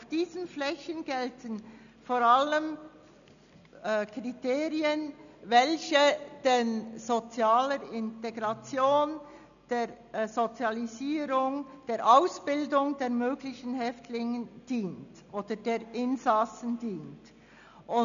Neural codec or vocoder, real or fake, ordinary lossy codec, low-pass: none; real; none; 7.2 kHz